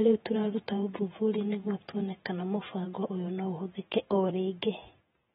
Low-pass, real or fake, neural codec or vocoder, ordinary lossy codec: 19.8 kHz; fake; vocoder, 48 kHz, 128 mel bands, Vocos; AAC, 16 kbps